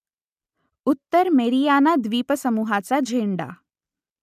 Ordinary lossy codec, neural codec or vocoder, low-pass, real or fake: none; none; 14.4 kHz; real